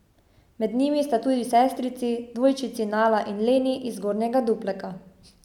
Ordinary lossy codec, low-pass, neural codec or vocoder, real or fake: none; 19.8 kHz; none; real